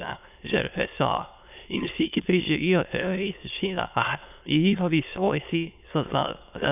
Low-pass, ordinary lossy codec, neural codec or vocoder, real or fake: 3.6 kHz; none; autoencoder, 22.05 kHz, a latent of 192 numbers a frame, VITS, trained on many speakers; fake